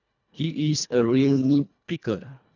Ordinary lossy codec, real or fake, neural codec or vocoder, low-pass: none; fake; codec, 24 kHz, 1.5 kbps, HILCodec; 7.2 kHz